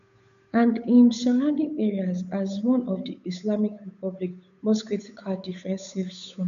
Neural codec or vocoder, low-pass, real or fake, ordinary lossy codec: codec, 16 kHz, 8 kbps, FunCodec, trained on Chinese and English, 25 frames a second; 7.2 kHz; fake; none